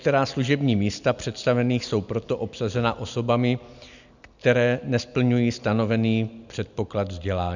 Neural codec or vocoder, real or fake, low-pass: none; real; 7.2 kHz